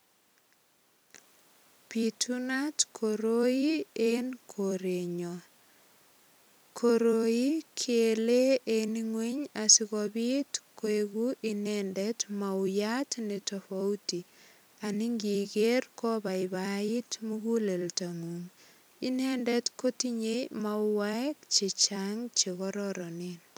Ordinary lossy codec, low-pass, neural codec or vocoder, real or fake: none; none; vocoder, 44.1 kHz, 128 mel bands every 256 samples, BigVGAN v2; fake